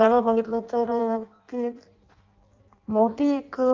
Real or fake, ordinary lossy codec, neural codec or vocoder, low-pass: fake; Opus, 32 kbps; codec, 16 kHz in and 24 kHz out, 0.6 kbps, FireRedTTS-2 codec; 7.2 kHz